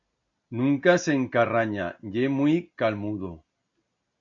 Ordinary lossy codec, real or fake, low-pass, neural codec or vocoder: MP3, 96 kbps; real; 7.2 kHz; none